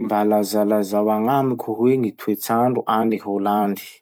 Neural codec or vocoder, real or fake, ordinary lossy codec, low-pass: vocoder, 44.1 kHz, 128 mel bands every 256 samples, BigVGAN v2; fake; none; none